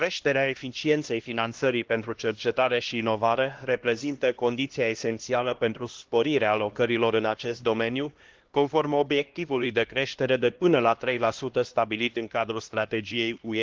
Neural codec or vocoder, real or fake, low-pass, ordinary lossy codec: codec, 16 kHz, 1 kbps, X-Codec, HuBERT features, trained on LibriSpeech; fake; 7.2 kHz; Opus, 16 kbps